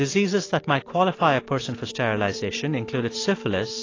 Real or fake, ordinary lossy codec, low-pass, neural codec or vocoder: real; AAC, 32 kbps; 7.2 kHz; none